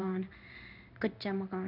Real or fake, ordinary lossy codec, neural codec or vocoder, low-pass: fake; none; codec, 16 kHz in and 24 kHz out, 1 kbps, XY-Tokenizer; 5.4 kHz